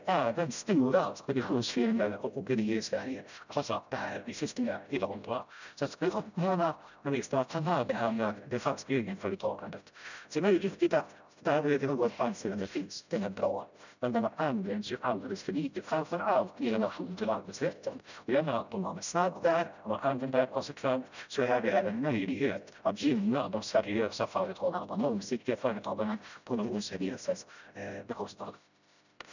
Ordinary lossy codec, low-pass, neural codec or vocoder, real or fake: none; 7.2 kHz; codec, 16 kHz, 0.5 kbps, FreqCodec, smaller model; fake